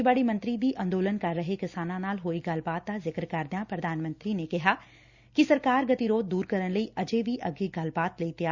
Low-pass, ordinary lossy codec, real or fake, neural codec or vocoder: 7.2 kHz; Opus, 64 kbps; real; none